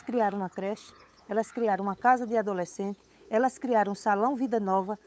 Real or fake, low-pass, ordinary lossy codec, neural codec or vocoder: fake; none; none; codec, 16 kHz, 8 kbps, FunCodec, trained on LibriTTS, 25 frames a second